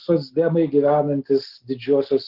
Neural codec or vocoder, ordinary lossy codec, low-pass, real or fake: none; Opus, 16 kbps; 5.4 kHz; real